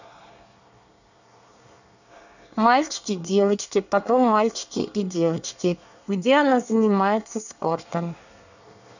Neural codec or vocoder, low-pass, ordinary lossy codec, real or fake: codec, 24 kHz, 1 kbps, SNAC; 7.2 kHz; none; fake